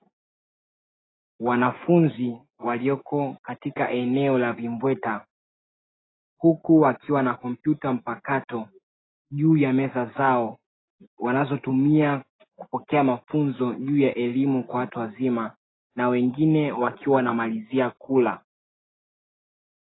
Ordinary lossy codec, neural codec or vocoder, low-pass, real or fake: AAC, 16 kbps; none; 7.2 kHz; real